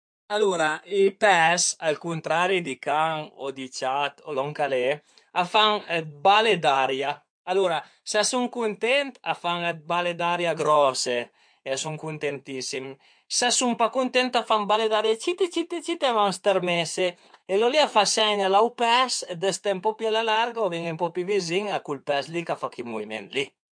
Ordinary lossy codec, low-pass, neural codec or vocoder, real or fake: MP3, 64 kbps; 9.9 kHz; codec, 16 kHz in and 24 kHz out, 2.2 kbps, FireRedTTS-2 codec; fake